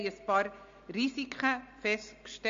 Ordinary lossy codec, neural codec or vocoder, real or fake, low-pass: MP3, 48 kbps; none; real; 7.2 kHz